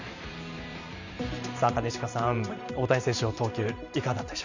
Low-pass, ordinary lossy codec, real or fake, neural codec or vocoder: 7.2 kHz; none; fake; vocoder, 44.1 kHz, 128 mel bands every 512 samples, BigVGAN v2